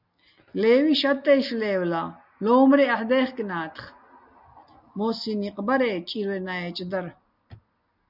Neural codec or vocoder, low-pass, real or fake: none; 5.4 kHz; real